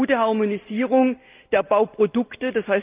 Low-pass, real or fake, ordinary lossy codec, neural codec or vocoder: 3.6 kHz; real; Opus, 24 kbps; none